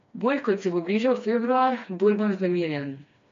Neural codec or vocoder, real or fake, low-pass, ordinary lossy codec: codec, 16 kHz, 2 kbps, FreqCodec, smaller model; fake; 7.2 kHz; MP3, 48 kbps